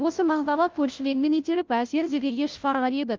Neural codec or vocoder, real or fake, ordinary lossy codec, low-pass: codec, 16 kHz, 0.5 kbps, FunCodec, trained on Chinese and English, 25 frames a second; fake; Opus, 32 kbps; 7.2 kHz